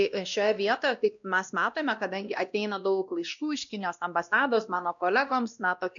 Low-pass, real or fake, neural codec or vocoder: 7.2 kHz; fake; codec, 16 kHz, 1 kbps, X-Codec, WavLM features, trained on Multilingual LibriSpeech